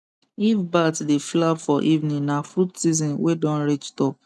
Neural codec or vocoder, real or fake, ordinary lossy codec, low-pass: none; real; none; none